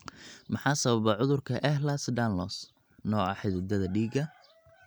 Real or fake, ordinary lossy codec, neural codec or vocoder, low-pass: real; none; none; none